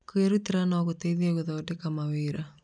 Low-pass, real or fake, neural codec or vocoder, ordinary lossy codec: 9.9 kHz; real; none; none